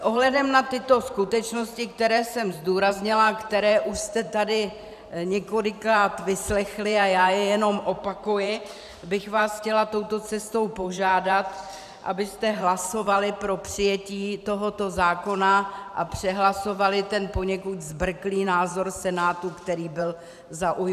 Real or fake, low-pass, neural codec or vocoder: fake; 14.4 kHz; vocoder, 44.1 kHz, 128 mel bands every 512 samples, BigVGAN v2